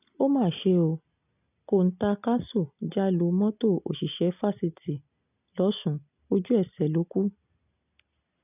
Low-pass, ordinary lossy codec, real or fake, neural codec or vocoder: 3.6 kHz; none; real; none